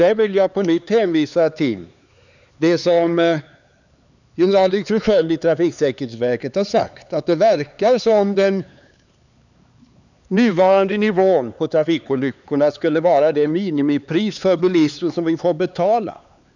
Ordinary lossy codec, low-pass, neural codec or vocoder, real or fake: none; 7.2 kHz; codec, 16 kHz, 4 kbps, X-Codec, HuBERT features, trained on LibriSpeech; fake